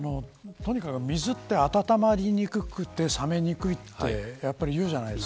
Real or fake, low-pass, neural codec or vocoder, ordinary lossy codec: real; none; none; none